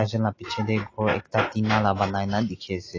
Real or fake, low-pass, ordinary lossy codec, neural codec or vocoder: real; 7.2 kHz; AAC, 32 kbps; none